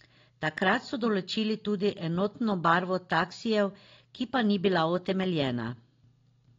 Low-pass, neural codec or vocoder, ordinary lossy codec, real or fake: 7.2 kHz; none; AAC, 32 kbps; real